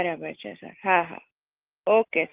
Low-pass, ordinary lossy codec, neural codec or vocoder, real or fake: 3.6 kHz; Opus, 32 kbps; none; real